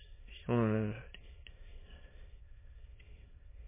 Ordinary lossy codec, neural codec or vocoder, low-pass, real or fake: MP3, 16 kbps; autoencoder, 22.05 kHz, a latent of 192 numbers a frame, VITS, trained on many speakers; 3.6 kHz; fake